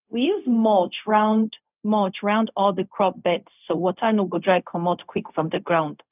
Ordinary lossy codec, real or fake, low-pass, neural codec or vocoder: none; fake; 3.6 kHz; codec, 16 kHz, 0.4 kbps, LongCat-Audio-Codec